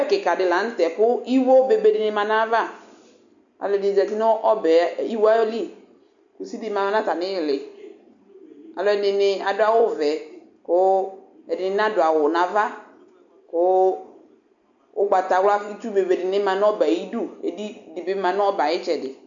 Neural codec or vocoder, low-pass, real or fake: none; 7.2 kHz; real